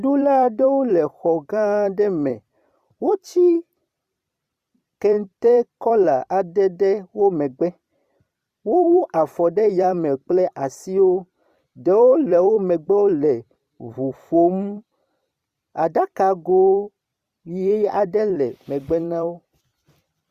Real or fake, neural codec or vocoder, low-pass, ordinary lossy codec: fake; vocoder, 44.1 kHz, 128 mel bands every 256 samples, BigVGAN v2; 14.4 kHz; Opus, 64 kbps